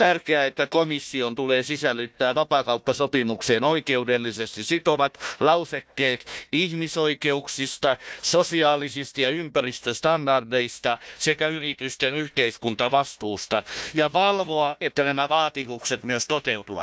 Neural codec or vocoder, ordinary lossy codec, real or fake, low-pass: codec, 16 kHz, 1 kbps, FunCodec, trained on Chinese and English, 50 frames a second; none; fake; none